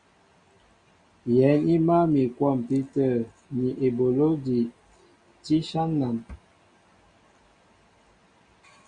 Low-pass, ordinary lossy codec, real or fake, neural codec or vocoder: 9.9 kHz; Opus, 64 kbps; real; none